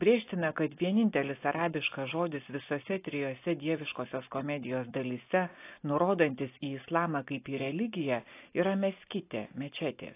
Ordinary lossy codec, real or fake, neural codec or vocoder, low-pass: AAC, 24 kbps; real; none; 3.6 kHz